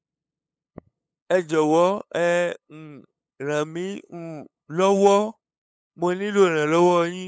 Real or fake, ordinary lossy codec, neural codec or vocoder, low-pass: fake; none; codec, 16 kHz, 8 kbps, FunCodec, trained on LibriTTS, 25 frames a second; none